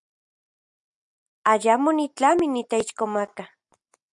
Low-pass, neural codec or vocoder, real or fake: 10.8 kHz; none; real